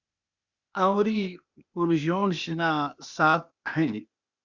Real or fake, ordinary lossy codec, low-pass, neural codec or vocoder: fake; Opus, 64 kbps; 7.2 kHz; codec, 16 kHz, 0.8 kbps, ZipCodec